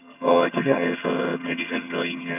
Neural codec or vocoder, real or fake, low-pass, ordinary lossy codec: vocoder, 22.05 kHz, 80 mel bands, HiFi-GAN; fake; 3.6 kHz; none